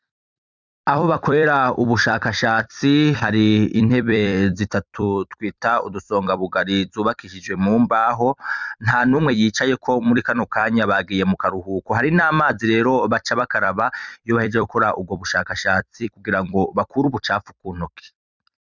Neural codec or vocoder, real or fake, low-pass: vocoder, 44.1 kHz, 128 mel bands every 256 samples, BigVGAN v2; fake; 7.2 kHz